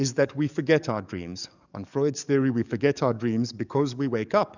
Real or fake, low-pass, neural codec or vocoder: fake; 7.2 kHz; codec, 44.1 kHz, 7.8 kbps, DAC